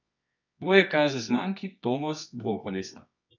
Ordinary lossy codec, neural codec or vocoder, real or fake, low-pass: none; codec, 24 kHz, 0.9 kbps, WavTokenizer, medium music audio release; fake; 7.2 kHz